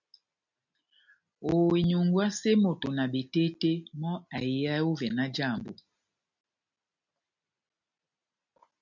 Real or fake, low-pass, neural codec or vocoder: real; 7.2 kHz; none